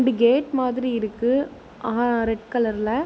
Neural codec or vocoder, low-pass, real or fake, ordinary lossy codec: none; none; real; none